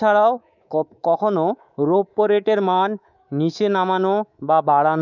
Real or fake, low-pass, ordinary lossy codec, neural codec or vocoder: fake; 7.2 kHz; none; codec, 24 kHz, 3.1 kbps, DualCodec